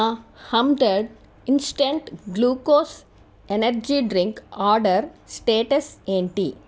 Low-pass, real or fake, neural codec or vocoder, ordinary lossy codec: none; real; none; none